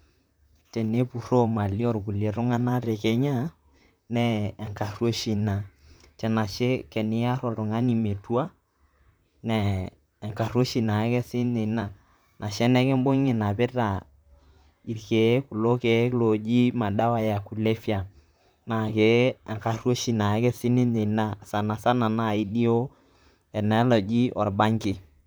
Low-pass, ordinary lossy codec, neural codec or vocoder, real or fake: none; none; vocoder, 44.1 kHz, 128 mel bands, Pupu-Vocoder; fake